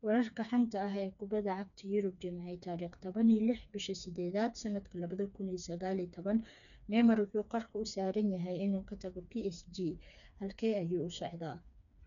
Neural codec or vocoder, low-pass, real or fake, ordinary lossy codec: codec, 16 kHz, 4 kbps, FreqCodec, smaller model; 7.2 kHz; fake; none